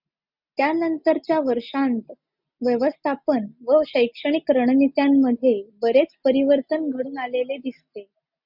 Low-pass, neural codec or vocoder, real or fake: 5.4 kHz; none; real